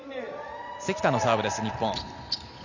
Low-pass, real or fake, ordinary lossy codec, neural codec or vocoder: 7.2 kHz; real; none; none